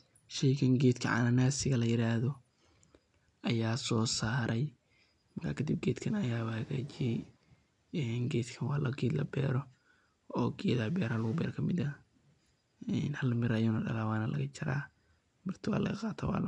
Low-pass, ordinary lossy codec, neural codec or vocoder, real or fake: 10.8 kHz; none; none; real